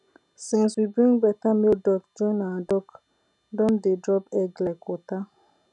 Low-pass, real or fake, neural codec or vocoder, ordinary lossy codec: 10.8 kHz; real; none; none